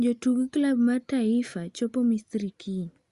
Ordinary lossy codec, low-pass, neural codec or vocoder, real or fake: Opus, 64 kbps; 10.8 kHz; none; real